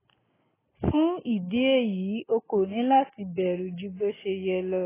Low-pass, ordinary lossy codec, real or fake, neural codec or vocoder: 3.6 kHz; AAC, 16 kbps; real; none